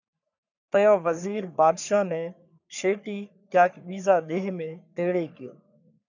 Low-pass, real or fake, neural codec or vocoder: 7.2 kHz; fake; codec, 44.1 kHz, 3.4 kbps, Pupu-Codec